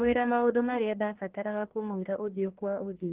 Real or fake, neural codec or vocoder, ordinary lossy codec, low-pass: fake; codec, 44.1 kHz, 1.7 kbps, Pupu-Codec; Opus, 16 kbps; 3.6 kHz